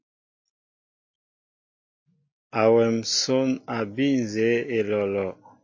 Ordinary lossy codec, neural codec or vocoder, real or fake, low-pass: MP3, 32 kbps; none; real; 7.2 kHz